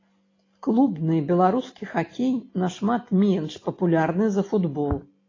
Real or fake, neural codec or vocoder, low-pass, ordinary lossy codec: real; none; 7.2 kHz; AAC, 32 kbps